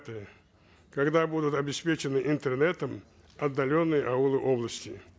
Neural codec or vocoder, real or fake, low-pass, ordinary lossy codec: none; real; none; none